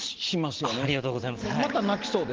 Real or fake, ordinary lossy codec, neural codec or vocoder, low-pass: real; Opus, 16 kbps; none; 7.2 kHz